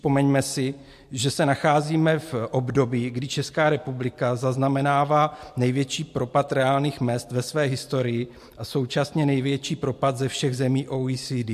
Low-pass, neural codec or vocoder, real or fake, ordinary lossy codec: 14.4 kHz; none; real; MP3, 64 kbps